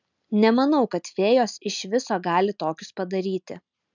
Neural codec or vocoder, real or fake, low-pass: none; real; 7.2 kHz